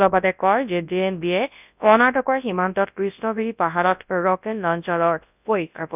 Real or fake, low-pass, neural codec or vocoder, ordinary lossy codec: fake; 3.6 kHz; codec, 24 kHz, 0.9 kbps, WavTokenizer, large speech release; none